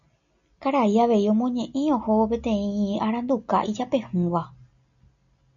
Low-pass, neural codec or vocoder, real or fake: 7.2 kHz; none; real